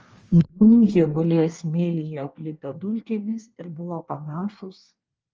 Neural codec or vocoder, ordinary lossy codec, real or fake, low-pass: codec, 24 kHz, 1 kbps, SNAC; Opus, 24 kbps; fake; 7.2 kHz